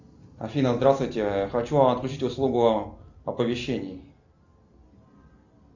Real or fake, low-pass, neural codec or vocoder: real; 7.2 kHz; none